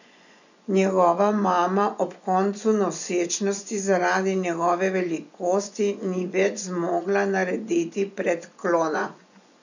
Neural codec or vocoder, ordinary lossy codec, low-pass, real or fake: vocoder, 24 kHz, 100 mel bands, Vocos; none; 7.2 kHz; fake